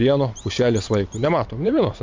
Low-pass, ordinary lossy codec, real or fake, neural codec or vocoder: 7.2 kHz; MP3, 48 kbps; fake; vocoder, 44.1 kHz, 128 mel bands every 512 samples, BigVGAN v2